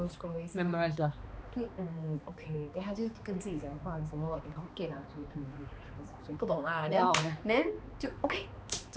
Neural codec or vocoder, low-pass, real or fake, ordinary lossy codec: codec, 16 kHz, 2 kbps, X-Codec, HuBERT features, trained on balanced general audio; none; fake; none